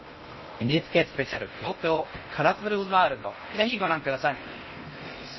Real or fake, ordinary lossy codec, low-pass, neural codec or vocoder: fake; MP3, 24 kbps; 7.2 kHz; codec, 16 kHz in and 24 kHz out, 0.6 kbps, FocalCodec, streaming, 2048 codes